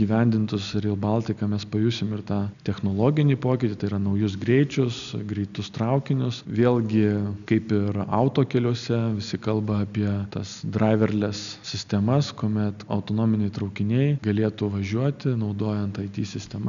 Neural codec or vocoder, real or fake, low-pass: none; real; 7.2 kHz